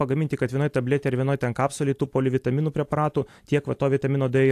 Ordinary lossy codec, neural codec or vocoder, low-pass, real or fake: AAC, 64 kbps; none; 14.4 kHz; real